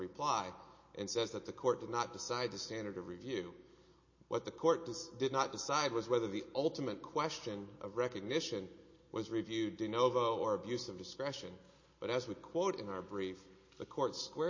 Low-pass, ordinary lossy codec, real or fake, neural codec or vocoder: 7.2 kHz; MP3, 32 kbps; real; none